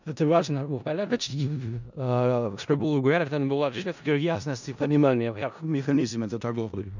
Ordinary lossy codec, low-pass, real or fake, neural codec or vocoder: none; 7.2 kHz; fake; codec, 16 kHz in and 24 kHz out, 0.4 kbps, LongCat-Audio-Codec, four codebook decoder